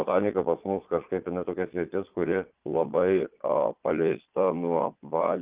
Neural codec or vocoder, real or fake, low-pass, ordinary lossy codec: vocoder, 22.05 kHz, 80 mel bands, Vocos; fake; 3.6 kHz; Opus, 32 kbps